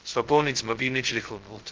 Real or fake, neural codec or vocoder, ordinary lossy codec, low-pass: fake; codec, 16 kHz, 0.2 kbps, FocalCodec; Opus, 16 kbps; 7.2 kHz